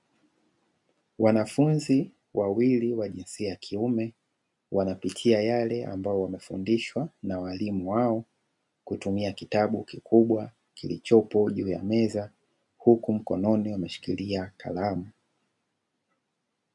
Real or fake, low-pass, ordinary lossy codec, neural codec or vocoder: real; 10.8 kHz; MP3, 64 kbps; none